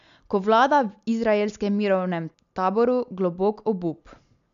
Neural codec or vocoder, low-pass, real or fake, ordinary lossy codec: none; 7.2 kHz; real; none